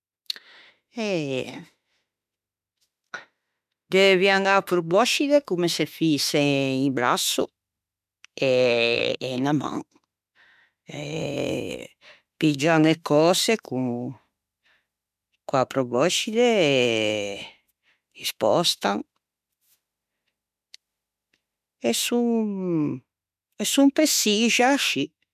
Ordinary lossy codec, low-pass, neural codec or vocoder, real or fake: none; 14.4 kHz; autoencoder, 48 kHz, 32 numbers a frame, DAC-VAE, trained on Japanese speech; fake